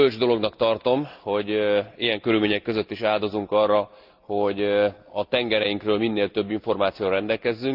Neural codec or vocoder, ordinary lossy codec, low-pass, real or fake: none; Opus, 24 kbps; 5.4 kHz; real